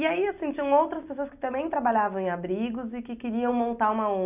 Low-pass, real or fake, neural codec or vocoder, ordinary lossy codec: 3.6 kHz; real; none; none